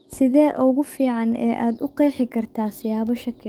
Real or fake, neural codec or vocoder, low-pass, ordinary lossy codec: fake; codec, 44.1 kHz, 7.8 kbps, DAC; 19.8 kHz; Opus, 24 kbps